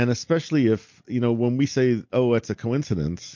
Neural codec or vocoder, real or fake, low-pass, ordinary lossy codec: none; real; 7.2 kHz; MP3, 48 kbps